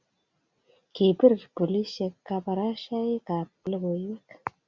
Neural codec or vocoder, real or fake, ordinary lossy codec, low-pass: none; real; Opus, 64 kbps; 7.2 kHz